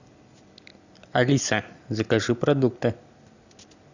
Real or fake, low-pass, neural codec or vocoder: real; 7.2 kHz; none